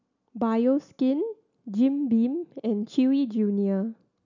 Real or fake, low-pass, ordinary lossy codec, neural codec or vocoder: real; 7.2 kHz; none; none